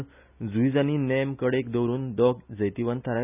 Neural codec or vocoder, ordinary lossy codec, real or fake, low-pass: none; none; real; 3.6 kHz